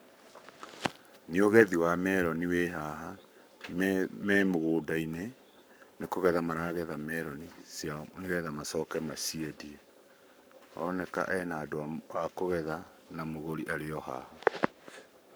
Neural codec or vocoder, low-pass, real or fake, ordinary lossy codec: codec, 44.1 kHz, 7.8 kbps, Pupu-Codec; none; fake; none